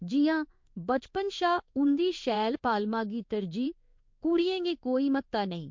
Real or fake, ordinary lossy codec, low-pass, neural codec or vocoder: fake; MP3, 48 kbps; 7.2 kHz; codec, 16 kHz in and 24 kHz out, 1 kbps, XY-Tokenizer